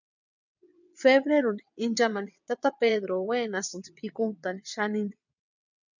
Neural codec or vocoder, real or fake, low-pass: vocoder, 22.05 kHz, 80 mel bands, WaveNeXt; fake; 7.2 kHz